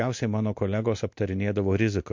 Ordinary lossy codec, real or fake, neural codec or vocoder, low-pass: MP3, 48 kbps; real; none; 7.2 kHz